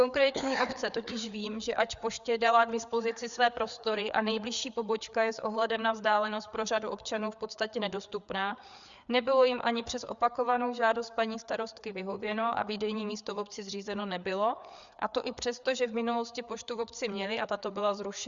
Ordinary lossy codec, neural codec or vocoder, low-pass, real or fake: Opus, 64 kbps; codec, 16 kHz, 4 kbps, FreqCodec, larger model; 7.2 kHz; fake